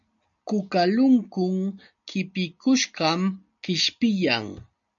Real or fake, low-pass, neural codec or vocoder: real; 7.2 kHz; none